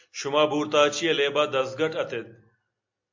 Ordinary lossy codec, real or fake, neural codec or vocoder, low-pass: MP3, 48 kbps; real; none; 7.2 kHz